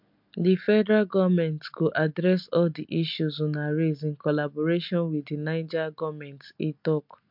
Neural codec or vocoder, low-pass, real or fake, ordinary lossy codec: none; 5.4 kHz; real; MP3, 48 kbps